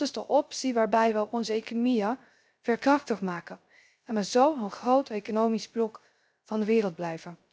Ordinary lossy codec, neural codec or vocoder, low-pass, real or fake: none; codec, 16 kHz, 0.3 kbps, FocalCodec; none; fake